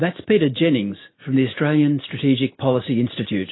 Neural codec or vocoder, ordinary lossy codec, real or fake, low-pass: none; AAC, 16 kbps; real; 7.2 kHz